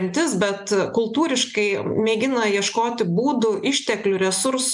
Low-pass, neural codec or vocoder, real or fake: 10.8 kHz; none; real